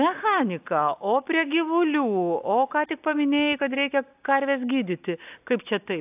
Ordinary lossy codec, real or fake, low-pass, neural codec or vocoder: AAC, 32 kbps; real; 3.6 kHz; none